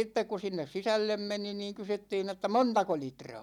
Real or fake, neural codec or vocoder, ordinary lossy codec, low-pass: real; none; none; 19.8 kHz